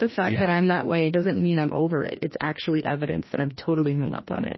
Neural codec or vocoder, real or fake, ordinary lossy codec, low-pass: codec, 16 kHz, 1 kbps, FreqCodec, larger model; fake; MP3, 24 kbps; 7.2 kHz